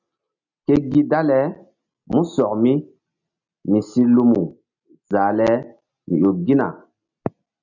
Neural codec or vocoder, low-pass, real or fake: none; 7.2 kHz; real